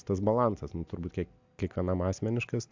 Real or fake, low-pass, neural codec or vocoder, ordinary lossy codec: real; 7.2 kHz; none; MP3, 64 kbps